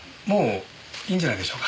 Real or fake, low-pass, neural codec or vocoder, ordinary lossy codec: real; none; none; none